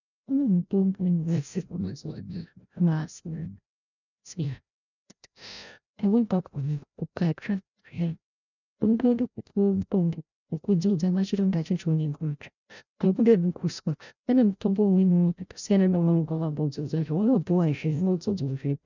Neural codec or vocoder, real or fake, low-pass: codec, 16 kHz, 0.5 kbps, FreqCodec, larger model; fake; 7.2 kHz